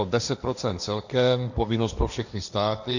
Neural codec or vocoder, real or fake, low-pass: codec, 16 kHz, 1.1 kbps, Voila-Tokenizer; fake; 7.2 kHz